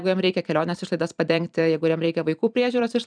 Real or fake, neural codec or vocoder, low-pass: fake; vocoder, 44.1 kHz, 128 mel bands every 256 samples, BigVGAN v2; 9.9 kHz